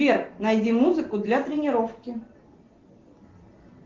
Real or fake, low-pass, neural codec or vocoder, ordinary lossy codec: real; 7.2 kHz; none; Opus, 16 kbps